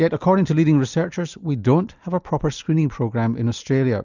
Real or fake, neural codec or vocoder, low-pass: real; none; 7.2 kHz